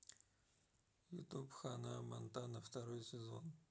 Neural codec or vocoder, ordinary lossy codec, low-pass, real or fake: none; none; none; real